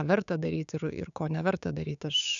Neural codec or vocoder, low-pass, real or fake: codec, 16 kHz, 6 kbps, DAC; 7.2 kHz; fake